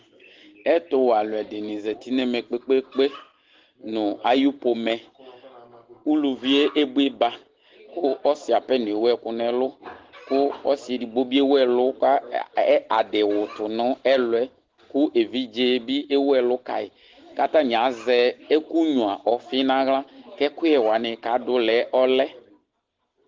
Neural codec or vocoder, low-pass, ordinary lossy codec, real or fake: none; 7.2 kHz; Opus, 16 kbps; real